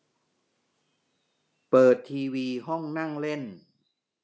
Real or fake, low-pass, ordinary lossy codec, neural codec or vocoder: real; none; none; none